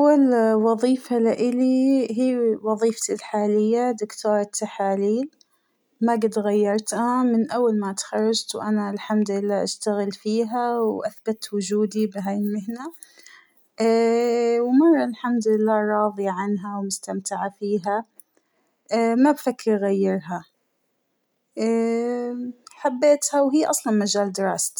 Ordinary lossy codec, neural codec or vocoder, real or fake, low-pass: none; none; real; none